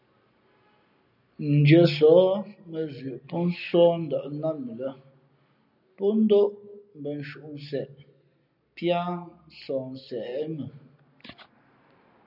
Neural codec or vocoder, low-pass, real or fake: none; 5.4 kHz; real